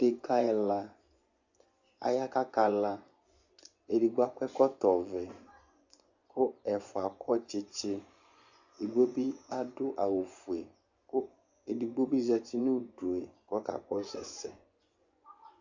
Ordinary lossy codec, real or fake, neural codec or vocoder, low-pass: Opus, 64 kbps; fake; vocoder, 24 kHz, 100 mel bands, Vocos; 7.2 kHz